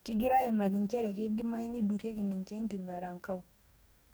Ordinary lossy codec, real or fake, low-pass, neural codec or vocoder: none; fake; none; codec, 44.1 kHz, 2.6 kbps, DAC